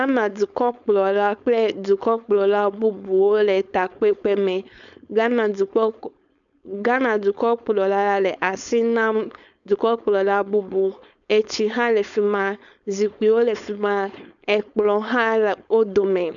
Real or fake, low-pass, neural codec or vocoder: fake; 7.2 kHz; codec, 16 kHz, 4.8 kbps, FACodec